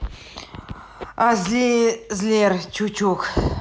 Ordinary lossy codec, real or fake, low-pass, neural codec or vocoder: none; real; none; none